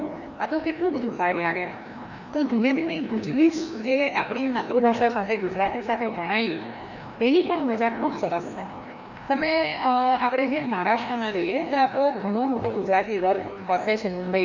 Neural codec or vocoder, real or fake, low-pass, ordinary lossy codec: codec, 16 kHz, 1 kbps, FreqCodec, larger model; fake; 7.2 kHz; none